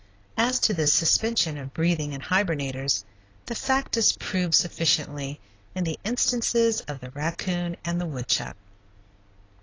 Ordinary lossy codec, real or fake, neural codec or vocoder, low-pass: AAC, 32 kbps; real; none; 7.2 kHz